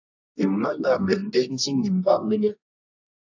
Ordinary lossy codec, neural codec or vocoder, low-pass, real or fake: AAC, 48 kbps; codec, 24 kHz, 0.9 kbps, WavTokenizer, medium music audio release; 7.2 kHz; fake